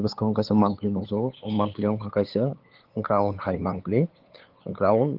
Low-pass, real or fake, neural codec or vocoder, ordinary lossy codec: 5.4 kHz; fake; codec, 16 kHz, 8 kbps, FunCodec, trained on LibriTTS, 25 frames a second; Opus, 16 kbps